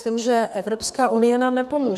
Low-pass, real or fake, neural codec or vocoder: 14.4 kHz; fake; codec, 32 kHz, 1.9 kbps, SNAC